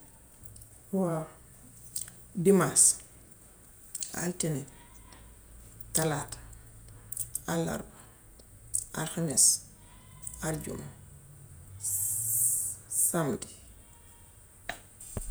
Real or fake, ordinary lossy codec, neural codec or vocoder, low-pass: fake; none; vocoder, 48 kHz, 128 mel bands, Vocos; none